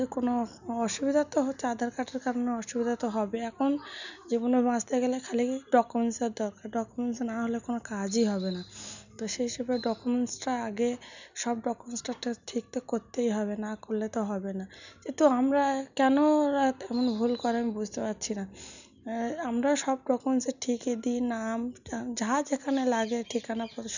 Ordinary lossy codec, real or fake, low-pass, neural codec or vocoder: none; real; 7.2 kHz; none